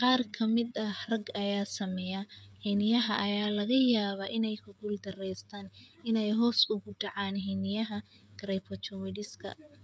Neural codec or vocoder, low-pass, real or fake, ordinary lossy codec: codec, 16 kHz, 16 kbps, FreqCodec, smaller model; none; fake; none